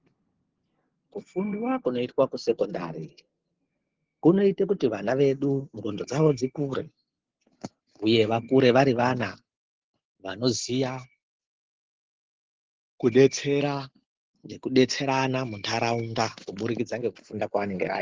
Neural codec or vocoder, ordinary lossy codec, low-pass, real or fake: none; Opus, 16 kbps; 7.2 kHz; real